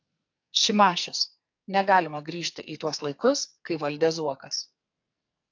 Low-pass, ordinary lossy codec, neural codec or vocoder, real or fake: 7.2 kHz; AAC, 48 kbps; codec, 44.1 kHz, 2.6 kbps, SNAC; fake